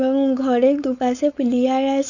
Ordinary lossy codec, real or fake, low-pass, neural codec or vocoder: none; fake; 7.2 kHz; codec, 16 kHz, 4.8 kbps, FACodec